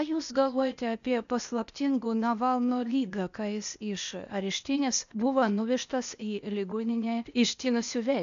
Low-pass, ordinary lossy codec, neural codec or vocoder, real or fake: 7.2 kHz; MP3, 96 kbps; codec, 16 kHz, 0.8 kbps, ZipCodec; fake